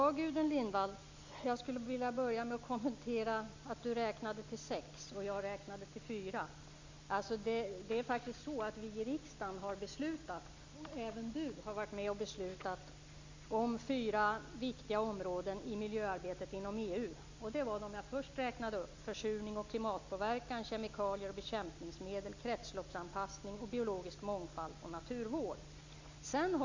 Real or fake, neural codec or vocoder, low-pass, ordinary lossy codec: real; none; 7.2 kHz; MP3, 64 kbps